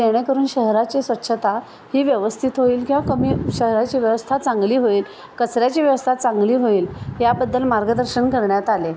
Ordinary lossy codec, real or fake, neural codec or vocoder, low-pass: none; real; none; none